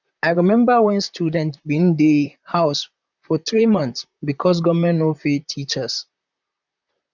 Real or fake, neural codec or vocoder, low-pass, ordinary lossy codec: fake; vocoder, 44.1 kHz, 128 mel bands, Pupu-Vocoder; 7.2 kHz; none